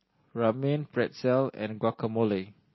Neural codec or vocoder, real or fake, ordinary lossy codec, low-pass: none; real; MP3, 24 kbps; 7.2 kHz